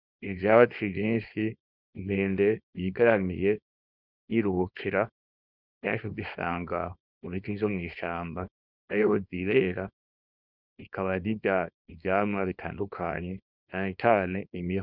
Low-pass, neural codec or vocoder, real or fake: 5.4 kHz; codec, 24 kHz, 0.9 kbps, WavTokenizer, small release; fake